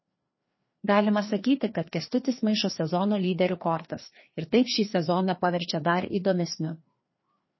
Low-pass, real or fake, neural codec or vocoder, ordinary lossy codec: 7.2 kHz; fake; codec, 16 kHz, 2 kbps, FreqCodec, larger model; MP3, 24 kbps